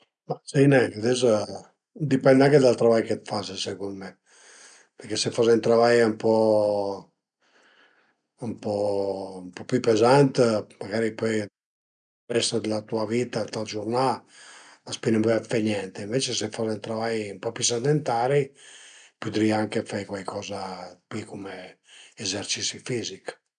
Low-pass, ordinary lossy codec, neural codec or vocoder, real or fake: 9.9 kHz; none; none; real